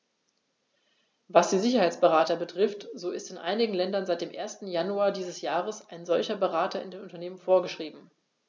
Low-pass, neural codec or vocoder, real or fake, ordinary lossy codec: none; none; real; none